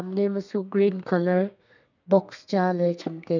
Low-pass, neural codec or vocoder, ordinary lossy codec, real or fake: 7.2 kHz; codec, 32 kHz, 1.9 kbps, SNAC; none; fake